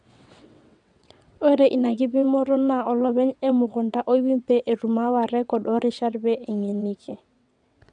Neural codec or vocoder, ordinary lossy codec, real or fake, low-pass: vocoder, 22.05 kHz, 80 mel bands, WaveNeXt; none; fake; 9.9 kHz